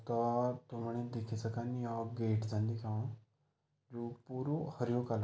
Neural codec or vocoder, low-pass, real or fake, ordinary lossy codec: none; none; real; none